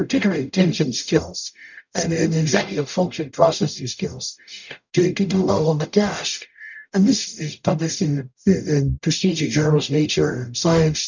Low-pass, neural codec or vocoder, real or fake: 7.2 kHz; codec, 44.1 kHz, 0.9 kbps, DAC; fake